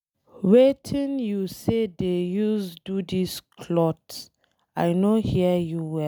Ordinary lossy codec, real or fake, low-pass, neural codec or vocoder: none; real; none; none